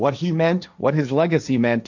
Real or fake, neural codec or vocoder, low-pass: fake; codec, 16 kHz, 1.1 kbps, Voila-Tokenizer; 7.2 kHz